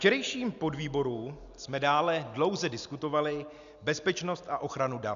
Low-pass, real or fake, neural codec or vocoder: 7.2 kHz; real; none